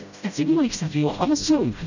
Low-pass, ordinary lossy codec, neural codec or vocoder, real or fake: 7.2 kHz; none; codec, 16 kHz, 0.5 kbps, FreqCodec, smaller model; fake